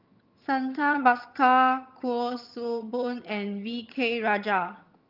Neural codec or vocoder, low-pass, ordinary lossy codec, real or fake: vocoder, 22.05 kHz, 80 mel bands, HiFi-GAN; 5.4 kHz; Opus, 24 kbps; fake